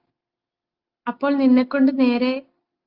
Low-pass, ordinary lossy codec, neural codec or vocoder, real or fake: 5.4 kHz; Opus, 32 kbps; none; real